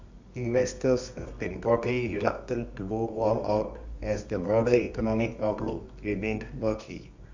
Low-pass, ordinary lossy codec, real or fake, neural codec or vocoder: 7.2 kHz; MP3, 64 kbps; fake; codec, 24 kHz, 0.9 kbps, WavTokenizer, medium music audio release